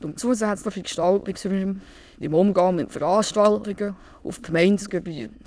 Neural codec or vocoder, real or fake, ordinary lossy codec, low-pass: autoencoder, 22.05 kHz, a latent of 192 numbers a frame, VITS, trained on many speakers; fake; none; none